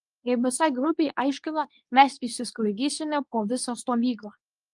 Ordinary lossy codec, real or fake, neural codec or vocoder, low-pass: Opus, 32 kbps; fake; codec, 24 kHz, 0.9 kbps, WavTokenizer, medium speech release version 2; 10.8 kHz